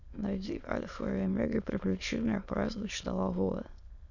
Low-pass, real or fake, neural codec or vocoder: 7.2 kHz; fake; autoencoder, 22.05 kHz, a latent of 192 numbers a frame, VITS, trained on many speakers